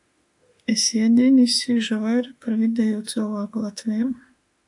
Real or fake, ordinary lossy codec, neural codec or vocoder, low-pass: fake; AAC, 64 kbps; autoencoder, 48 kHz, 32 numbers a frame, DAC-VAE, trained on Japanese speech; 10.8 kHz